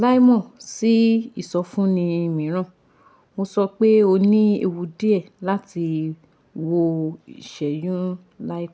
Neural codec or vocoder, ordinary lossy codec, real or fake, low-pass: none; none; real; none